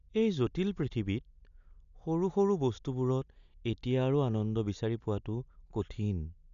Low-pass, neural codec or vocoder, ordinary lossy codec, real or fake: 7.2 kHz; none; none; real